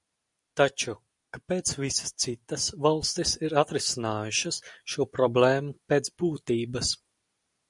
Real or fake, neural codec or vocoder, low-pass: real; none; 10.8 kHz